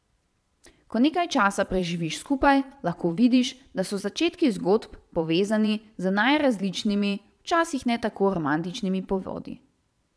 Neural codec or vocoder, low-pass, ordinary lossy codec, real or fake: vocoder, 22.05 kHz, 80 mel bands, Vocos; none; none; fake